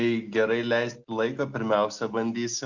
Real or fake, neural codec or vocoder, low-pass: real; none; 7.2 kHz